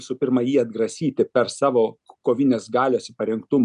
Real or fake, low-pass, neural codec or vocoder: real; 10.8 kHz; none